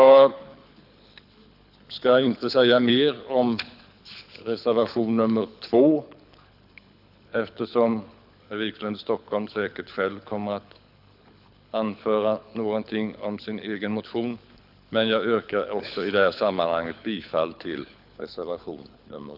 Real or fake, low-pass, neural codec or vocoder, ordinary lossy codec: fake; 5.4 kHz; codec, 24 kHz, 6 kbps, HILCodec; none